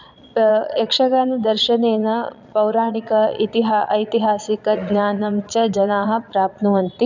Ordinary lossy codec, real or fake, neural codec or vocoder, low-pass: none; fake; vocoder, 22.05 kHz, 80 mel bands, Vocos; 7.2 kHz